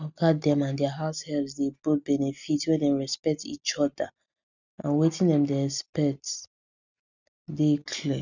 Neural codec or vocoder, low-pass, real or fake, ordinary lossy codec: none; 7.2 kHz; real; none